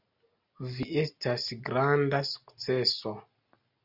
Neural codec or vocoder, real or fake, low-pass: none; real; 5.4 kHz